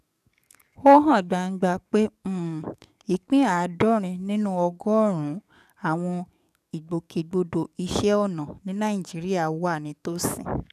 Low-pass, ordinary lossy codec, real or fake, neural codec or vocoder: 14.4 kHz; none; fake; codec, 44.1 kHz, 7.8 kbps, DAC